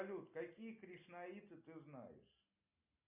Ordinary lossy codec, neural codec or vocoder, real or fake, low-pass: MP3, 32 kbps; none; real; 3.6 kHz